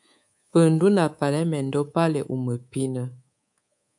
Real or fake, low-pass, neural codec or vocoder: fake; 10.8 kHz; codec, 24 kHz, 3.1 kbps, DualCodec